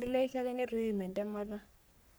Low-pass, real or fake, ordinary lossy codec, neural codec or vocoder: none; fake; none; codec, 44.1 kHz, 3.4 kbps, Pupu-Codec